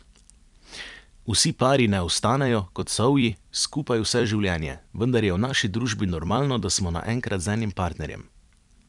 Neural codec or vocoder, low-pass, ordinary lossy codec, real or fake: vocoder, 44.1 kHz, 128 mel bands every 256 samples, BigVGAN v2; 10.8 kHz; none; fake